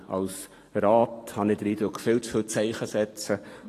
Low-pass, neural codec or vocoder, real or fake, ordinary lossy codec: 14.4 kHz; vocoder, 44.1 kHz, 128 mel bands every 512 samples, BigVGAN v2; fake; AAC, 48 kbps